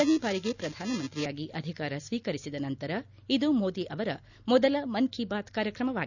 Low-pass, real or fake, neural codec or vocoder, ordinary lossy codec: 7.2 kHz; real; none; none